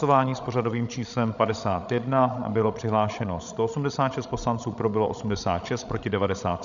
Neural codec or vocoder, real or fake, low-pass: codec, 16 kHz, 16 kbps, FreqCodec, larger model; fake; 7.2 kHz